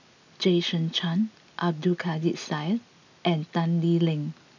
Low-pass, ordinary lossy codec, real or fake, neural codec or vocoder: 7.2 kHz; none; real; none